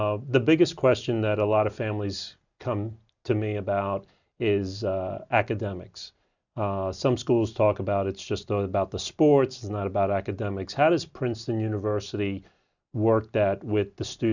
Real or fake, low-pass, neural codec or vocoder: real; 7.2 kHz; none